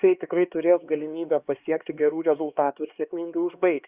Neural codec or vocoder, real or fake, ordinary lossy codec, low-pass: codec, 16 kHz, 2 kbps, X-Codec, HuBERT features, trained on LibriSpeech; fake; Opus, 64 kbps; 3.6 kHz